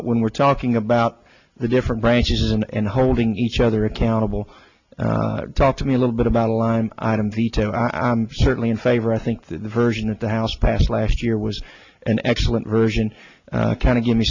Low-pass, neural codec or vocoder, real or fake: 7.2 kHz; none; real